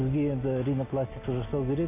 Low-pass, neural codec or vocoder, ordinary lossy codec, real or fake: 3.6 kHz; none; AAC, 24 kbps; real